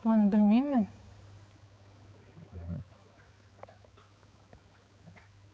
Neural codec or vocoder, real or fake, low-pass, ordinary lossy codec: codec, 16 kHz, 4 kbps, X-Codec, HuBERT features, trained on balanced general audio; fake; none; none